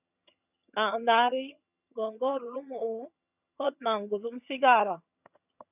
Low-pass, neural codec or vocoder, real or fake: 3.6 kHz; vocoder, 22.05 kHz, 80 mel bands, HiFi-GAN; fake